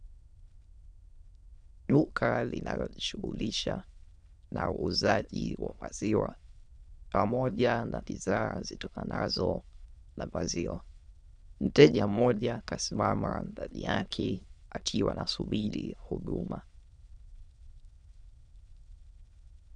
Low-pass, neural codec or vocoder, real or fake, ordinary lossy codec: 9.9 kHz; autoencoder, 22.05 kHz, a latent of 192 numbers a frame, VITS, trained on many speakers; fake; MP3, 96 kbps